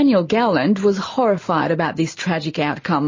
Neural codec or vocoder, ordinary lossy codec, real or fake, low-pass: none; MP3, 32 kbps; real; 7.2 kHz